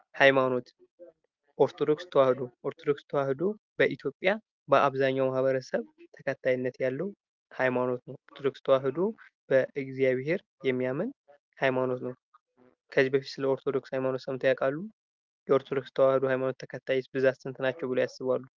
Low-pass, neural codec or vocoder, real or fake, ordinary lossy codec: 7.2 kHz; none; real; Opus, 32 kbps